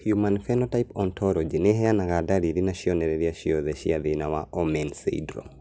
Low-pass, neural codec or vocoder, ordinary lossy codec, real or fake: none; none; none; real